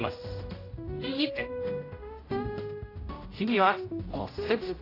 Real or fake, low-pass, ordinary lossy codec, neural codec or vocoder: fake; 5.4 kHz; AAC, 24 kbps; codec, 16 kHz, 0.5 kbps, X-Codec, HuBERT features, trained on general audio